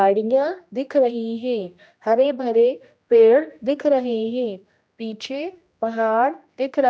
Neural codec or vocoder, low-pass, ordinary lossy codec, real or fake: codec, 16 kHz, 1 kbps, X-Codec, HuBERT features, trained on general audio; none; none; fake